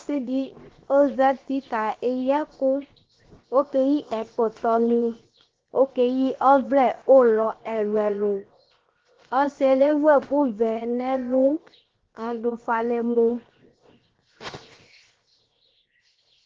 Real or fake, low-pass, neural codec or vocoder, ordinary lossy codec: fake; 7.2 kHz; codec, 16 kHz, 0.7 kbps, FocalCodec; Opus, 16 kbps